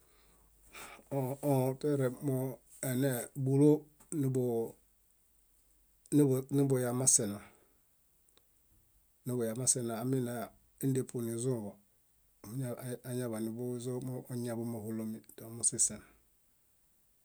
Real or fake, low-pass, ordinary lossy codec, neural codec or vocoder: real; none; none; none